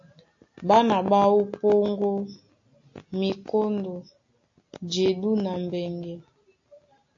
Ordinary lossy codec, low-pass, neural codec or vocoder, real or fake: AAC, 48 kbps; 7.2 kHz; none; real